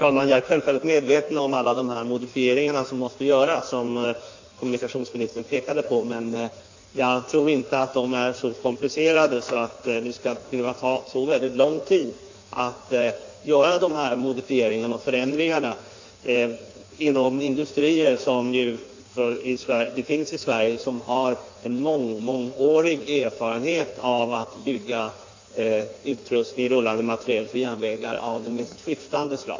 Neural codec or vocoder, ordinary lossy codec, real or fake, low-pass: codec, 16 kHz in and 24 kHz out, 1.1 kbps, FireRedTTS-2 codec; AAC, 48 kbps; fake; 7.2 kHz